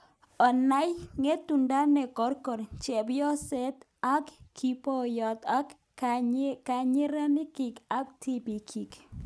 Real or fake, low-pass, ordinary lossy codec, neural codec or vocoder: real; none; none; none